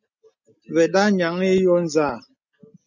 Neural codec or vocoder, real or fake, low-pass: none; real; 7.2 kHz